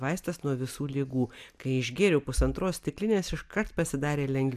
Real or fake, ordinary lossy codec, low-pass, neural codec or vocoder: real; MP3, 96 kbps; 14.4 kHz; none